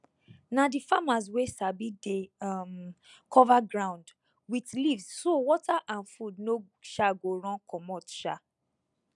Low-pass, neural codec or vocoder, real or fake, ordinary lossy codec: 10.8 kHz; none; real; none